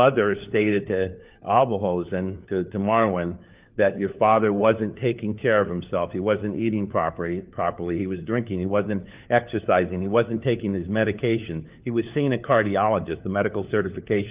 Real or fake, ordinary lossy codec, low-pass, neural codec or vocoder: fake; Opus, 24 kbps; 3.6 kHz; codec, 16 kHz, 4 kbps, FunCodec, trained on LibriTTS, 50 frames a second